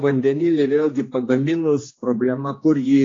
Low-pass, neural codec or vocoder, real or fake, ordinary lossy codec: 7.2 kHz; codec, 16 kHz, 2 kbps, X-Codec, HuBERT features, trained on general audio; fake; AAC, 32 kbps